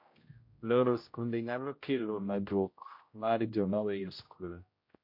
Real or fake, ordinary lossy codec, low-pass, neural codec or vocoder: fake; MP3, 32 kbps; 5.4 kHz; codec, 16 kHz, 0.5 kbps, X-Codec, HuBERT features, trained on general audio